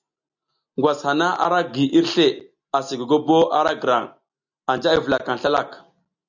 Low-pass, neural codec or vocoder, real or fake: 7.2 kHz; none; real